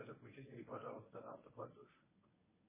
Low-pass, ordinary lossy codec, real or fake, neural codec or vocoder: 3.6 kHz; MP3, 16 kbps; fake; codec, 24 kHz, 0.9 kbps, WavTokenizer, medium speech release version 1